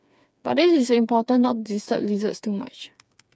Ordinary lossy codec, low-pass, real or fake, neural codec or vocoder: none; none; fake; codec, 16 kHz, 4 kbps, FreqCodec, smaller model